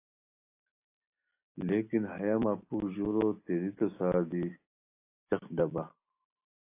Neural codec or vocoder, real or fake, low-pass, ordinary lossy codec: none; real; 3.6 kHz; AAC, 24 kbps